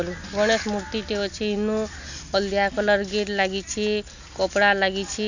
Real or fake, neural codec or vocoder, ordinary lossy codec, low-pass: real; none; none; 7.2 kHz